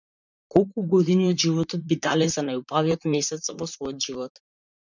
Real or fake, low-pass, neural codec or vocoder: fake; 7.2 kHz; vocoder, 44.1 kHz, 128 mel bands, Pupu-Vocoder